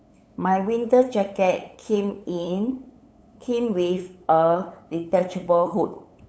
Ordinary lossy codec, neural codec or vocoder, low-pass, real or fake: none; codec, 16 kHz, 8 kbps, FunCodec, trained on LibriTTS, 25 frames a second; none; fake